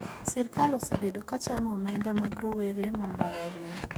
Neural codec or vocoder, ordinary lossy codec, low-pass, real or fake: codec, 44.1 kHz, 2.6 kbps, DAC; none; none; fake